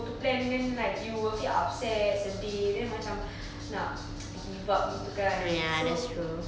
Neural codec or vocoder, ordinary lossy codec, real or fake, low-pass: none; none; real; none